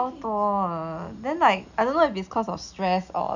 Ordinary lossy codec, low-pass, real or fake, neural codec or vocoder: none; 7.2 kHz; real; none